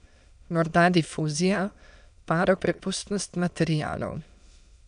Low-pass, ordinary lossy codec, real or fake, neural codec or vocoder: 9.9 kHz; none; fake; autoencoder, 22.05 kHz, a latent of 192 numbers a frame, VITS, trained on many speakers